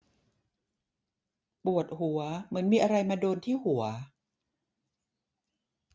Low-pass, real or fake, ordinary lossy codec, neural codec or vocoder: none; real; none; none